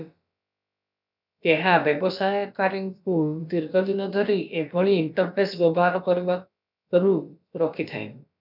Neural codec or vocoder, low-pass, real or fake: codec, 16 kHz, about 1 kbps, DyCAST, with the encoder's durations; 5.4 kHz; fake